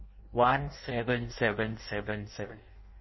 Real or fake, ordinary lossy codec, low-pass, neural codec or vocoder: fake; MP3, 24 kbps; 7.2 kHz; codec, 16 kHz in and 24 kHz out, 0.6 kbps, FireRedTTS-2 codec